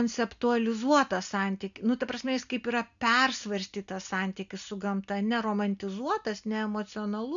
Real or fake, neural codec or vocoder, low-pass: real; none; 7.2 kHz